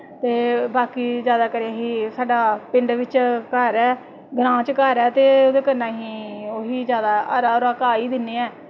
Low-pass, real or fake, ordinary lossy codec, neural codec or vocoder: none; real; none; none